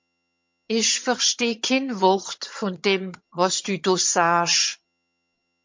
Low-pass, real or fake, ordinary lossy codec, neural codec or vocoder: 7.2 kHz; fake; MP3, 48 kbps; vocoder, 22.05 kHz, 80 mel bands, HiFi-GAN